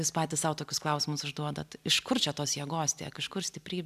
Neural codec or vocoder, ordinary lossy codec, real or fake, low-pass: none; AAC, 96 kbps; real; 14.4 kHz